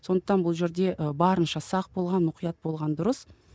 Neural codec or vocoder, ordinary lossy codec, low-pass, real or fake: none; none; none; real